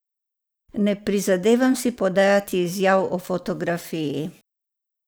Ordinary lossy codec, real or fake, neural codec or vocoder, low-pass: none; fake; vocoder, 44.1 kHz, 128 mel bands every 512 samples, BigVGAN v2; none